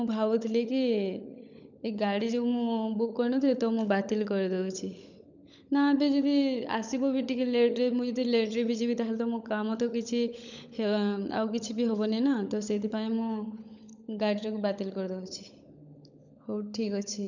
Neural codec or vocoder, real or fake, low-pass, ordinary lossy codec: codec, 16 kHz, 16 kbps, FunCodec, trained on LibriTTS, 50 frames a second; fake; 7.2 kHz; none